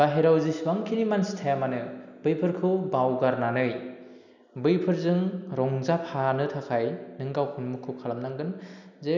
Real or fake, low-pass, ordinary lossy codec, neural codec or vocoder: real; 7.2 kHz; none; none